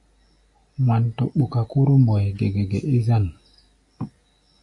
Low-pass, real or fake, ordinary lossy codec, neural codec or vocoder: 10.8 kHz; real; AAC, 48 kbps; none